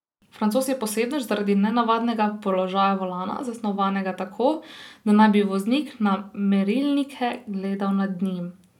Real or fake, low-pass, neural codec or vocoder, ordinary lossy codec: real; 19.8 kHz; none; none